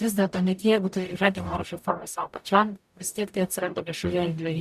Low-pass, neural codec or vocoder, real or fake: 14.4 kHz; codec, 44.1 kHz, 0.9 kbps, DAC; fake